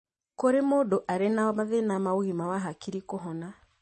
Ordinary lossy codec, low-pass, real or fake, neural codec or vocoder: MP3, 32 kbps; 10.8 kHz; real; none